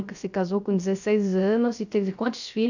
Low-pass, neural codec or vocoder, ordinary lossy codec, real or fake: 7.2 kHz; codec, 16 kHz, 0.3 kbps, FocalCodec; none; fake